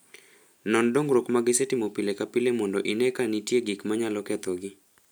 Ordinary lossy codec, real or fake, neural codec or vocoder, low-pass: none; real; none; none